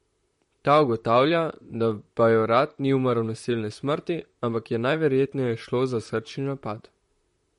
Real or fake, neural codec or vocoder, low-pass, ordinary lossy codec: fake; vocoder, 44.1 kHz, 128 mel bands, Pupu-Vocoder; 19.8 kHz; MP3, 48 kbps